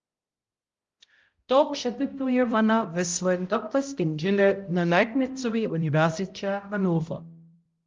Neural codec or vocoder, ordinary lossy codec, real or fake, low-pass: codec, 16 kHz, 0.5 kbps, X-Codec, HuBERT features, trained on balanced general audio; Opus, 32 kbps; fake; 7.2 kHz